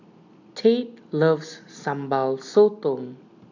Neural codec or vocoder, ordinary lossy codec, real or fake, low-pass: none; none; real; 7.2 kHz